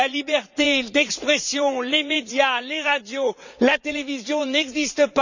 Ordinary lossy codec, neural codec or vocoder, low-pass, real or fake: none; vocoder, 44.1 kHz, 80 mel bands, Vocos; 7.2 kHz; fake